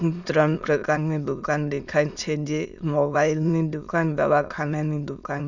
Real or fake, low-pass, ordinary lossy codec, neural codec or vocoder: fake; 7.2 kHz; none; autoencoder, 22.05 kHz, a latent of 192 numbers a frame, VITS, trained on many speakers